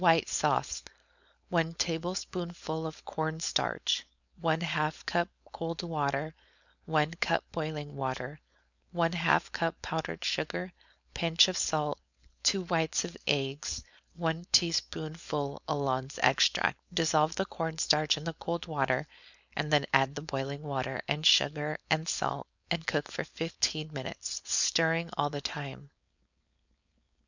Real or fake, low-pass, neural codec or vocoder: fake; 7.2 kHz; codec, 16 kHz, 4.8 kbps, FACodec